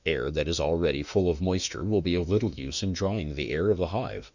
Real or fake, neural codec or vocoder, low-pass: fake; autoencoder, 48 kHz, 32 numbers a frame, DAC-VAE, trained on Japanese speech; 7.2 kHz